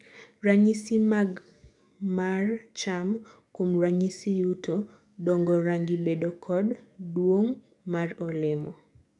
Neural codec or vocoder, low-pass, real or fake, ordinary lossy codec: codec, 44.1 kHz, 7.8 kbps, DAC; 10.8 kHz; fake; none